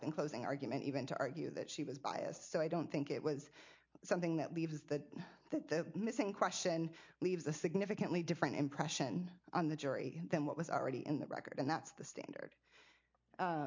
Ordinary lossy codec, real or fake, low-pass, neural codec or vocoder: MP3, 48 kbps; real; 7.2 kHz; none